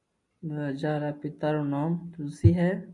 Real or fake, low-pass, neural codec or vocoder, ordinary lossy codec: real; 10.8 kHz; none; AAC, 64 kbps